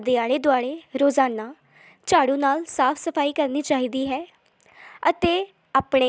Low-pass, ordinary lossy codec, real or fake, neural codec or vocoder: none; none; real; none